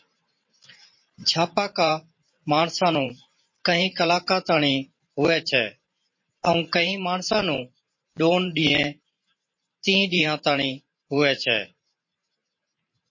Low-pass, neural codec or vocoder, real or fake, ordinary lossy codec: 7.2 kHz; vocoder, 44.1 kHz, 128 mel bands every 512 samples, BigVGAN v2; fake; MP3, 32 kbps